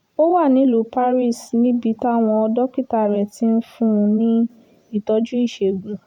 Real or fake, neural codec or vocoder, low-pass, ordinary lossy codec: fake; vocoder, 44.1 kHz, 128 mel bands every 512 samples, BigVGAN v2; 19.8 kHz; none